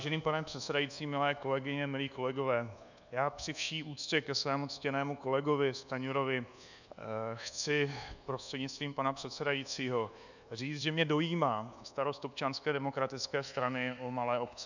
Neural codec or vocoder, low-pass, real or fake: codec, 24 kHz, 1.2 kbps, DualCodec; 7.2 kHz; fake